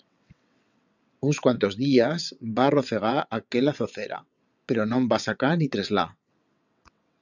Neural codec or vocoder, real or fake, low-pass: vocoder, 22.05 kHz, 80 mel bands, WaveNeXt; fake; 7.2 kHz